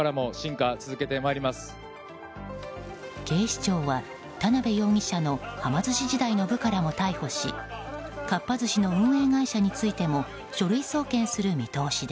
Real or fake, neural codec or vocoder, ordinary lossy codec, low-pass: real; none; none; none